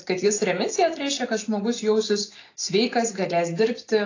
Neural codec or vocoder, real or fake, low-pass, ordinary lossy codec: none; real; 7.2 kHz; AAC, 32 kbps